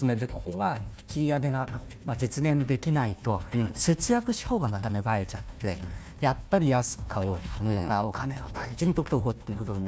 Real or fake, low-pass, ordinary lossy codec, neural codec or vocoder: fake; none; none; codec, 16 kHz, 1 kbps, FunCodec, trained on Chinese and English, 50 frames a second